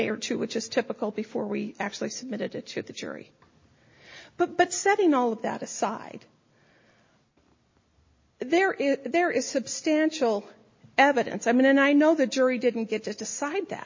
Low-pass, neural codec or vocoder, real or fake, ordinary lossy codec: 7.2 kHz; none; real; MP3, 32 kbps